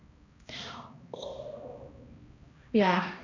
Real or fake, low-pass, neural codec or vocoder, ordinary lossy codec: fake; 7.2 kHz; codec, 16 kHz, 1 kbps, X-Codec, HuBERT features, trained on balanced general audio; AAC, 48 kbps